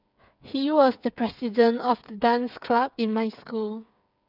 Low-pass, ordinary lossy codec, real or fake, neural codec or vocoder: 5.4 kHz; none; fake; codec, 16 kHz, 8 kbps, FreqCodec, smaller model